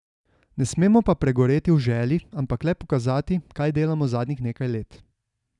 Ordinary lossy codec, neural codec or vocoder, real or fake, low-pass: none; none; real; 10.8 kHz